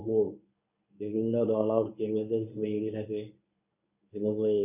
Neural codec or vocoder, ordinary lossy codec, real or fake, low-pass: codec, 24 kHz, 0.9 kbps, WavTokenizer, medium speech release version 1; none; fake; 3.6 kHz